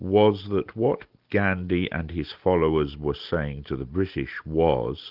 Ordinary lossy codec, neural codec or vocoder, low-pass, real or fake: Opus, 32 kbps; none; 5.4 kHz; real